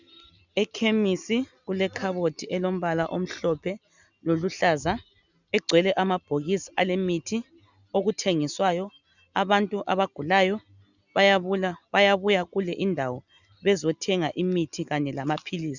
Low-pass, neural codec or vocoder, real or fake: 7.2 kHz; none; real